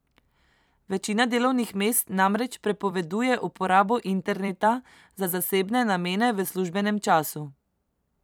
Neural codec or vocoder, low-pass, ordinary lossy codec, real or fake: vocoder, 44.1 kHz, 128 mel bands every 256 samples, BigVGAN v2; none; none; fake